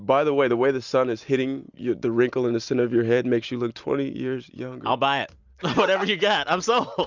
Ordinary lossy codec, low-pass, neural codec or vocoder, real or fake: Opus, 64 kbps; 7.2 kHz; none; real